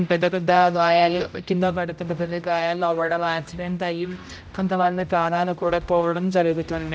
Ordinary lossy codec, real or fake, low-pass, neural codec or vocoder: none; fake; none; codec, 16 kHz, 0.5 kbps, X-Codec, HuBERT features, trained on general audio